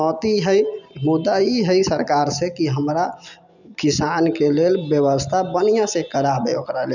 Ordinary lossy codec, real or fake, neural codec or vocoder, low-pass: none; real; none; 7.2 kHz